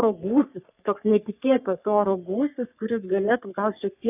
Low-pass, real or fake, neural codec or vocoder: 3.6 kHz; fake; codec, 44.1 kHz, 3.4 kbps, Pupu-Codec